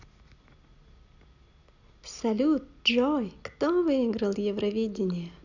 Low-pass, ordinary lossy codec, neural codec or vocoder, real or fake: 7.2 kHz; none; none; real